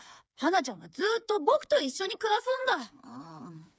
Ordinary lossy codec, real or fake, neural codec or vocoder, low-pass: none; fake; codec, 16 kHz, 4 kbps, FreqCodec, smaller model; none